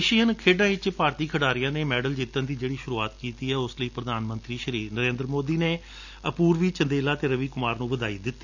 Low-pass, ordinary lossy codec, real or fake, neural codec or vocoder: 7.2 kHz; none; real; none